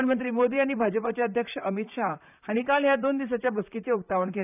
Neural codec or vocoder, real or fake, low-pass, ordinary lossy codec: vocoder, 44.1 kHz, 128 mel bands, Pupu-Vocoder; fake; 3.6 kHz; none